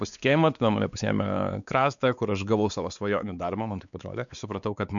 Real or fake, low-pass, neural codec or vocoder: fake; 7.2 kHz; codec, 16 kHz, 4 kbps, X-Codec, WavLM features, trained on Multilingual LibriSpeech